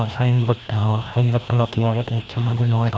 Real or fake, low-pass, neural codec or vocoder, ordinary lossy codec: fake; none; codec, 16 kHz, 1 kbps, FreqCodec, larger model; none